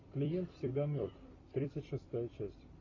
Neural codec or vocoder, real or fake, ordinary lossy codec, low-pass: vocoder, 44.1 kHz, 128 mel bands every 256 samples, BigVGAN v2; fake; AAC, 32 kbps; 7.2 kHz